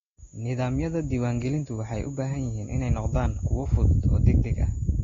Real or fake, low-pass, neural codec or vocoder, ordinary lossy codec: real; 7.2 kHz; none; AAC, 24 kbps